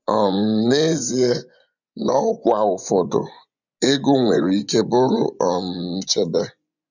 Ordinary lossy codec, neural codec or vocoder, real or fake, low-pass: none; vocoder, 24 kHz, 100 mel bands, Vocos; fake; 7.2 kHz